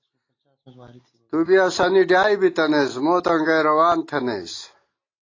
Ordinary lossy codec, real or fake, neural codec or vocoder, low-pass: AAC, 32 kbps; real; none; 7.2 kHz